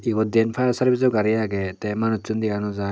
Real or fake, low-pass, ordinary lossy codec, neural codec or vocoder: real; none; none; none